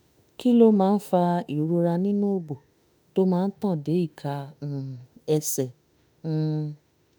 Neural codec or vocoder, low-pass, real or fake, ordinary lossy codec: autoencoder, 48 kHz, 32 numbers a frame, DAC-VAE, trained on Japanese speech; none; fake; none